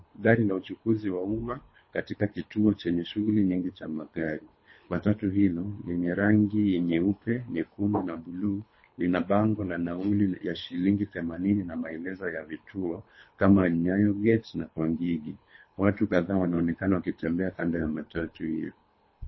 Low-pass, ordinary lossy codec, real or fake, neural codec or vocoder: 7.2 kHz; MP3, 24 kbps; fake; codec, 24 kHz, 3 kbps, HILCodec